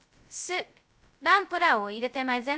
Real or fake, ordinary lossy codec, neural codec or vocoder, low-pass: fake; none; codec, 16 kHz, 0.2 kbps, FocalCodec; none